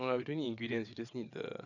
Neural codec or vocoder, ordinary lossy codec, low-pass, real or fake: vocoder, 22.05 kHz, 80 mel bands, WaveNeXt; none; 7.2 kHz; fake